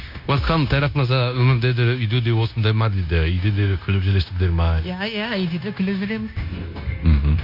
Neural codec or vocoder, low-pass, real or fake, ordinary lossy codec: codec, 16 kHz, 0.9 kbps, LongCat-Audio-Codec; 5.4 kHz; fake; none